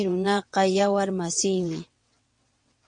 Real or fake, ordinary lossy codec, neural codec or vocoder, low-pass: fake; MP3, 48 kbps; vocoder, 22.05 kHz, 80 mel bands, WaveNeXt; 9.9 kHz